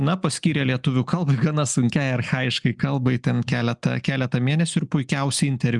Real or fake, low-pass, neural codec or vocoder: real; 10.8 kHz; none